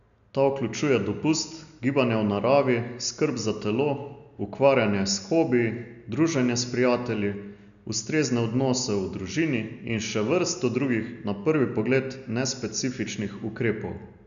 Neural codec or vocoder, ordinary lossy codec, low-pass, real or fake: none; none; 7.2 kHz; real